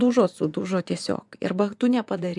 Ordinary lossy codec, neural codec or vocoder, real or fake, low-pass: MP3, 96 kbps; vocoder, 24 kHz, 100 mel bands, Vocos; fake; 10.8 kHz